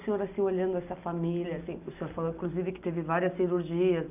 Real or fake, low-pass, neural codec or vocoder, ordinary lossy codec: real; 3.6 kHz; none; none